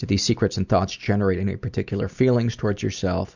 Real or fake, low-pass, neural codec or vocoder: real; 7.2 kHz; none